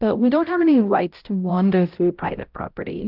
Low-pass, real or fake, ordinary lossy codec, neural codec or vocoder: 5.4 kHz; fake; Opus, 24 kbps; codec, 16 kHz, 0.5 kbps, X-Codec, HuBERT features, trained on balanced general audio